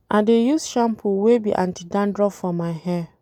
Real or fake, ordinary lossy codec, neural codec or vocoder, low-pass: real; none; none; 19.8 kHz